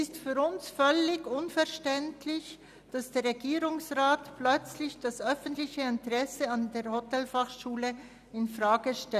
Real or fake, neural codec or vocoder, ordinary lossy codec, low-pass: real; none; none; 14.4 kHz